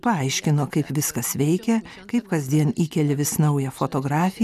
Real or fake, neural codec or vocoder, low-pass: real; none; 14.4 kHz